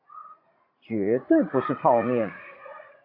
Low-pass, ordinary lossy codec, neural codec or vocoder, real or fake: 5.4 kHz; AAC, 32 kbps; vocoder, 44.1 kHz, 80 mel bands, Vocos; fake